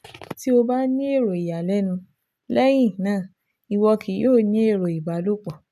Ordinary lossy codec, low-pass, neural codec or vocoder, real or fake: none; 14.4 kHz; none; real